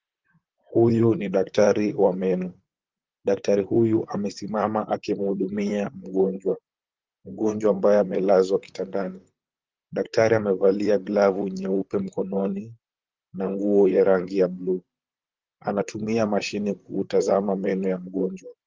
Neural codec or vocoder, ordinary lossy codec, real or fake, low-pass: vocoder, 44.1 kHz, 128 mel bands, Pupu-Vocoder; Opus, 32 kbps; fake; 7.2 kHz